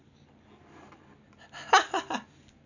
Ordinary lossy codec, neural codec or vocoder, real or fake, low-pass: none; none; real; 7.2 kHz